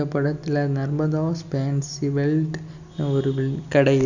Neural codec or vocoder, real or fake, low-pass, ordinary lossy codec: none; real; 7.2 kHz; none